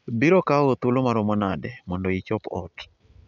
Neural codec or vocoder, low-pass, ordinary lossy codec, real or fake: none; 7.2 kHz; none; real